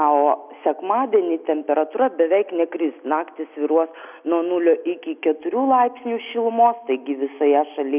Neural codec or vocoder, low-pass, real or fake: none; 3.6 kHz; real